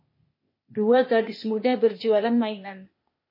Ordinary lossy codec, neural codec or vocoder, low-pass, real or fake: MP3, 24 kbps; codec, 16 kHz, 0.8 kbps, ZipCodec; 5.4 kHz; fake